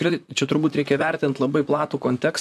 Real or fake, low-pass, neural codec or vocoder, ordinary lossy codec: fake; 14.4 kHz; vocoder, 44.1 kHz, 128 mel bands, Pupu-Vocoder; MP3, 96 kbps